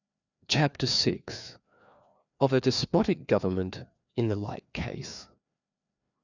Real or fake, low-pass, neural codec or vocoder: fake; 7.2 kHz; codec, 16 kHz, 2 kbps, FreqCodec, larger model